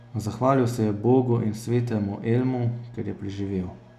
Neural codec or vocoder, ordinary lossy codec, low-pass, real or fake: none; none; 14.4 kHz; real